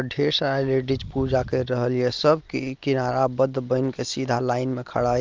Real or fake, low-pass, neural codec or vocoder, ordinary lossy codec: real; 7.2 kHz; none; Opus, 32 kbps